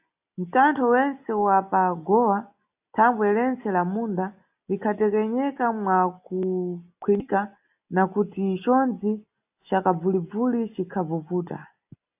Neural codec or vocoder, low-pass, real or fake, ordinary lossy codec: none; 3.6 kHz; real; AAC, 32 kbps